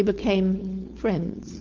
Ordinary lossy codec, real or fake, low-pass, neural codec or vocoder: Opus, 32 kbps; fake; 7.2 kHz; codec, 16 kHz, 4.8 kbps, FACodec